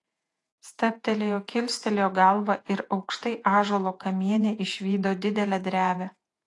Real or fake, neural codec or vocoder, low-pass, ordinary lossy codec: fake; vocoder, 48 kHz, 128 mel bands, Vocos; 10.8 kHz; AAC, 48 kbps